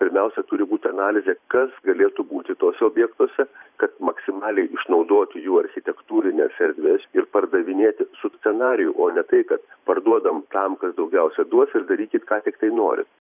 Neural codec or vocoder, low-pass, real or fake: vocoder, 44.1 kHz, 128 mel bands every 512 samples, BigVGAN v2; 3.6 kHz; fake